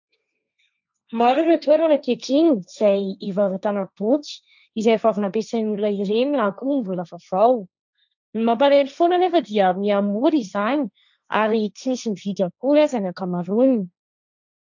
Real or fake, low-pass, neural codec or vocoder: fake; 7.2 kHz; codec, 16 kHz, 1.1 kbps, Voila-Tokenizer